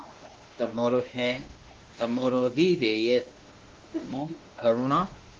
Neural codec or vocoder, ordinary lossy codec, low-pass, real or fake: codec, 16 kHz, 1 kbps, X-Codec, WavLM features, trained on Multilingual LibriSpeech; Opus, 16 kbps; 7.2 kHz; fake